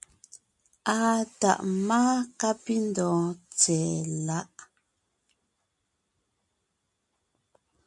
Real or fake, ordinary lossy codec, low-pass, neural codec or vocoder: real; MP3, 96 kbps; 10.8 kHz; none